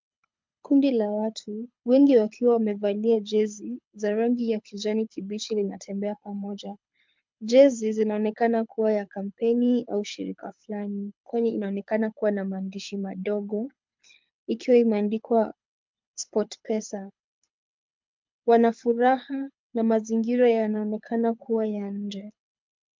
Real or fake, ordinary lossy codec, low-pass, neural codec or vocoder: fake; MP3, 64 kbps; 7.2 kHz; codec, 24 kHz, 6 kbps, HILCodec